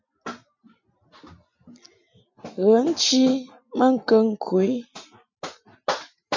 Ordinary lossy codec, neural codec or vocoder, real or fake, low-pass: MP3, 64 kbps; none; real; 7.2 kHz